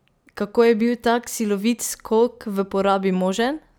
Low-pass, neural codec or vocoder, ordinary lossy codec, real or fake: none; none; none; real